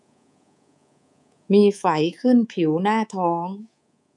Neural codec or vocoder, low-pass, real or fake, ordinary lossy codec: codec, 24 kHz, 3.1 kbps, DualCodec; 10.8 kHz; fake; none